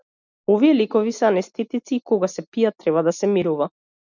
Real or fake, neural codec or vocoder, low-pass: real; none; 7.2 kHz